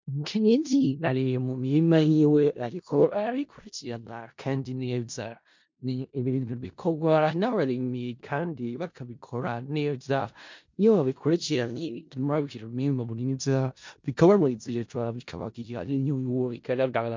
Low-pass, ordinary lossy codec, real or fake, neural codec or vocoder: 7.2 kHz; MP3, 48 kbps; fake; codec, 16 kHz in and 24 kHz out, 0.4 kbps, LongCat-Audio-Codec, four codebook decoder